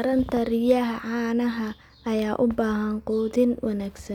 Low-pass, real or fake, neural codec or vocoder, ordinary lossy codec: 19.8 kHz; real; none; none